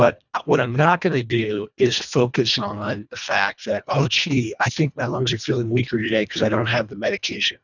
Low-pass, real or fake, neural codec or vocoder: 7.2 kHz; fake; codec, 24 kHz, 1.5 kbps, HILCodec